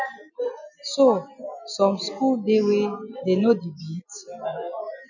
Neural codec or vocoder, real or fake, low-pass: none; real; 7.2 kHz